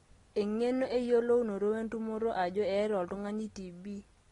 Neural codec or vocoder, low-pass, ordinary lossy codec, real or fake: none; 10.8 kHz; AAC, 32 kbps; real